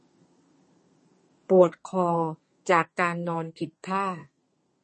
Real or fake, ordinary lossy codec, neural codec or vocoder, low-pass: fake; MP3, 32 kbps; codec, 32 kHz, 1.9 kbps, SNAC; 10.8 kHz